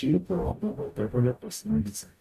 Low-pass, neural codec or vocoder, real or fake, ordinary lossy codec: 14.4 kHz; codec, 44.1 kHz, 0.9 kbps, DAC; fake; none